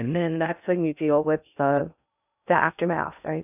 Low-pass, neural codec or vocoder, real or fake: 3.6 kHz; codec, 16 kHz in and 24 kHz out, 0.6 kbps, FocalCodec, streaming, 2048 codes; fake